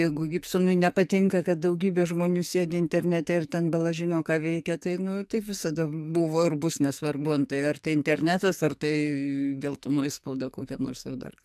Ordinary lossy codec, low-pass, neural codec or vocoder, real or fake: AAC, 96 kbps; 14.4 kHz; codec, 44.1 kHz, 2.6 kbps, SNAC; fake